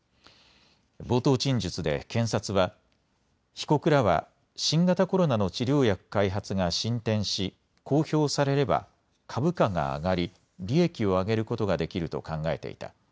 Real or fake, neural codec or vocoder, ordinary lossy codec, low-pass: real; none; none; none